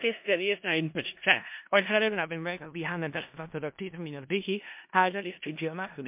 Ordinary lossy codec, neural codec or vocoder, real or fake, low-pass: MP3, 32 kbps; codec, 16 kHz in and 24 kHz out, 0.4 kbps, LongCat-Audio-Codec, four codebook decoder; fake; 3.6 kHz